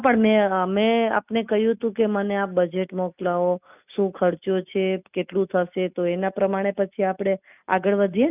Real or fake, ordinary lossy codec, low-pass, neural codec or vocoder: real; none; 3.6 kHz; none